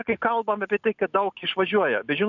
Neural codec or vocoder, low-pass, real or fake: none; 7.2 kHz; real